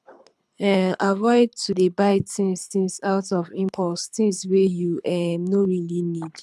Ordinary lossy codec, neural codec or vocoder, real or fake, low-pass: none; codec, 24 kHz, 6 kbps, HILCodec; fake; none